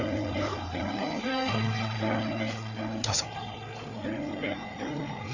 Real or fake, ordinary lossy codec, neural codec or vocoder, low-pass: fake; none; codec, 16 kHz, 4 kbps, FreqCodec, larger model; 7.2 kHz